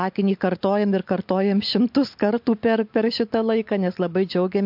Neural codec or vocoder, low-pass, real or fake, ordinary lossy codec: none; 5.4 kHz; real; MP3, 48 kbps